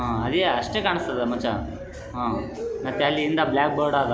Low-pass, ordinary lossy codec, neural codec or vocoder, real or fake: none; none; none; real